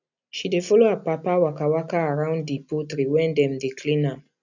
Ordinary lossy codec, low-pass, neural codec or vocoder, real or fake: none; 7.2 kHz; none; real